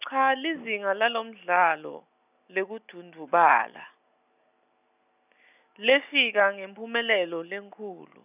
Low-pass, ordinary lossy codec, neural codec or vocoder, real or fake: 3.6 kHz; none; none; real